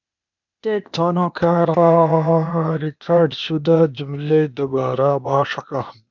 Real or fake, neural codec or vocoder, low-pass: fake; codec, 16 kHz, 0.8 kbps, ZipCodec; 7.2 kHz